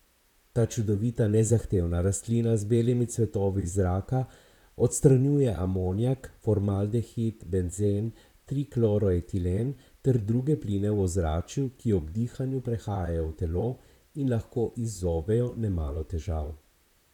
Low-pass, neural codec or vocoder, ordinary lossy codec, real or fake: 19.8 kHz; vocoder, 44.1 kHz, 128 mel bands, Pupu-Vocoder; none; fake